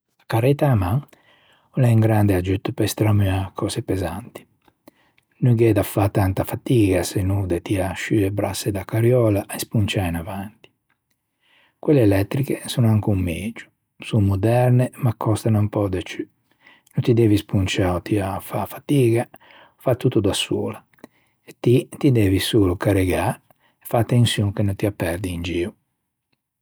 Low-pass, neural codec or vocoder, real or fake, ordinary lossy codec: none; none; real; none